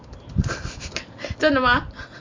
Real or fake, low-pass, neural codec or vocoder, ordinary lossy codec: real; 7.2 kHz; none; MP3, 48 kbps